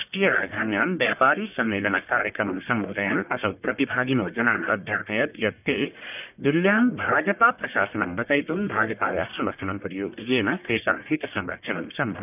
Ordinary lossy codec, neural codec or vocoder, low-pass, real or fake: none; codec, 44.1 kHz, 1.7 kbps, Pupu-Codec; 3.6 kHz; fake